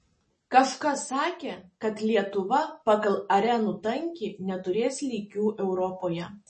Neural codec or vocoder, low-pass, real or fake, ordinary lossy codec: none; 10.8 kHz; real; MP3, 32 kbps